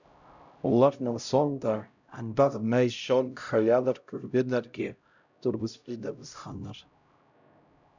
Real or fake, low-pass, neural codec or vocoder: fake; 7.2 kHz; codec, 16 kHz, 0.5 kbps, X-Codec, HuBERT features, trained on LibriSpeech